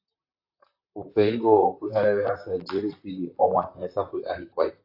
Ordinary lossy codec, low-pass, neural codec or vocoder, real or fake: AAC, 32 kbps; 5.4 kHz; vocoder, 44.1 kHz, 128 mel bands, Pupu-Vocoder; fake